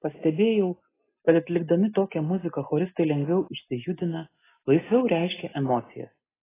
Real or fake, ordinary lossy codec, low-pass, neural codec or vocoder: real; AAC, 16 kbps; 3.6 kHz; none